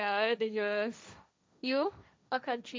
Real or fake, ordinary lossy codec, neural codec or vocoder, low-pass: fake; none; codec, 16 kHz, 1.1 kbps, Voila-Tokenizer; none